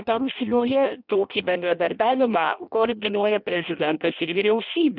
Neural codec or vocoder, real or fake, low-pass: codec, 16 kHz in and 24 kHz out, 0.6 kbps, FireRedTTS-2 codec; fake; 5.4 kHz